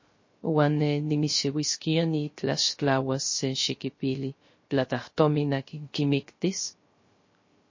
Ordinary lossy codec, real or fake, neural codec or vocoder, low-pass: MP3, 32 kbps; fake; codec, 16 kHz, 0.3 kbps, FocalCodec; 7.2 kHz